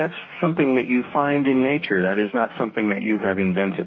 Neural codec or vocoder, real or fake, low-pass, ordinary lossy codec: codec, 44.1 kHz, 2.6 kbps, DAC; fake; 7.2 kHz; MP3, 32 kbps